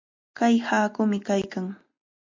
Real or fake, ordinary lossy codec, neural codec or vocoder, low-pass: real; MP3, 48 kbps; none; 7.2 kHz